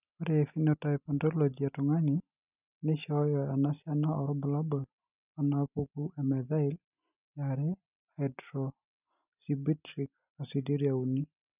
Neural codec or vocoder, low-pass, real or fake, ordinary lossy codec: none; 3.6 kHz; real; none